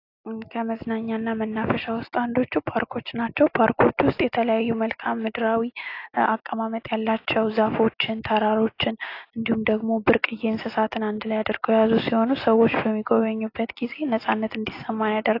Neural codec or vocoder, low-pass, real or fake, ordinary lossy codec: none; 5.4 kHz; real; AAC, 32 kbps